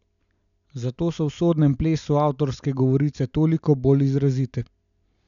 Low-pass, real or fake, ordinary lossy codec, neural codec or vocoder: 7.2 kHz; real; none; none